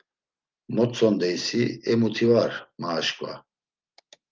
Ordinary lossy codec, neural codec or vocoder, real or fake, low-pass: Opus, 32 kbps; none; real; 7.2 kHz